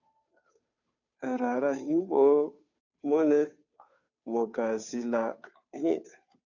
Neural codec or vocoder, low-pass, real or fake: codec, 16 kHz, 2 kbps, FunCodec, trained on Chinese and English, 25 frames a second; 7.2 kHz; fake